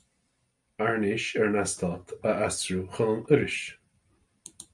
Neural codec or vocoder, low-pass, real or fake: none; 10.8 kHz; real